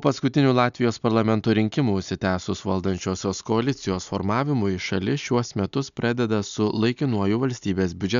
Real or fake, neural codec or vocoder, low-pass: real; none; 7.2 kHz